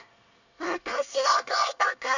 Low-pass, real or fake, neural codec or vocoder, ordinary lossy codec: 7.2 kHz; fake; codec, 24 kHz, 1 kbps, SNAC; none